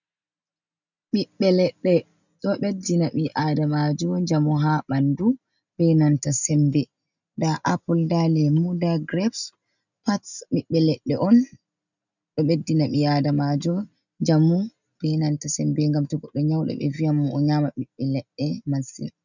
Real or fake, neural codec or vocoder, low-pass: real; none; 7.2 kHz